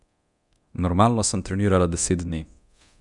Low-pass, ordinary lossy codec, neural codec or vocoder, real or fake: 10.8 kHz; none; codec, 24 kHz, 0.9 kbps, DualCodec; fake